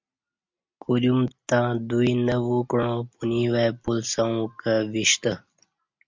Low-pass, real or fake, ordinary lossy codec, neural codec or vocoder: 7.2 kHz; real; MP3, 64 kbps; none